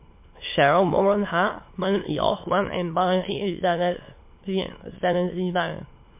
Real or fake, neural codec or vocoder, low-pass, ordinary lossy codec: fake; autoencoder, 22.05 kHz, a latent of 192 numbers a frame, VITS, trained on many speakers; 3.6 kHz; MP3, 24 kbps